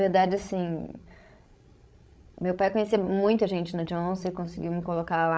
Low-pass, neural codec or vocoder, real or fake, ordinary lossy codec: none; codec, 16 kHz, 16 kbps, FreqCodec, larger model; fake; none